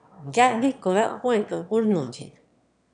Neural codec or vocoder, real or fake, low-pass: autoencoder, 22.05 kHz, a latent of 192 numbers a frame, VITS, trained on one speaker; fake; 9.9 kHz